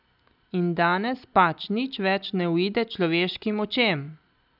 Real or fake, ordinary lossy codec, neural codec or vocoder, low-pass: real; none; none; 5.4 kHz